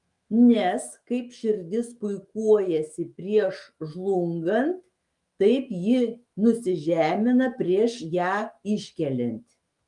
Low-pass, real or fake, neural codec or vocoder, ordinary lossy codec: 10.8 kHz; fake; autoencoder, 48 kHz, 128 numbers a frame, DAC-VAE, trained on Japanese speech; Opus, 32 kbps